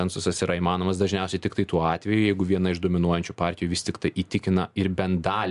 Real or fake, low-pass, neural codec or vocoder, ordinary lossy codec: real; 10.8 kHz; none; MP3, 96 kbps